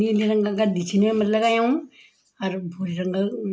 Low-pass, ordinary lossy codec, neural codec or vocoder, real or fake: none; none; none; real